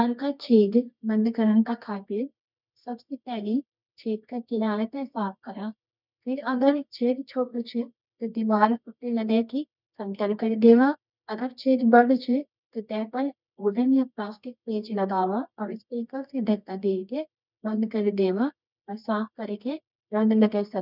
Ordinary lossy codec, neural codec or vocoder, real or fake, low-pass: none; codec, 24 kHz, 0.9 kbps, WavTokenizer, medium music audio release; fake; 5.4 kHz